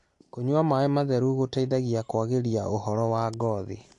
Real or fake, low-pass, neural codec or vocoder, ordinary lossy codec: real; 10.8 kHz; none; AAC, 48 kbps